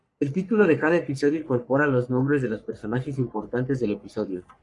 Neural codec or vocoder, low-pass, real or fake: codec, 44.1 kHz, 3.4 kbps, Pupu-Codec; 10.8 kHz; fake